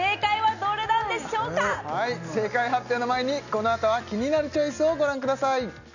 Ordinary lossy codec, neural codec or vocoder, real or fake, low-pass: none; none; real; 7.2 kHz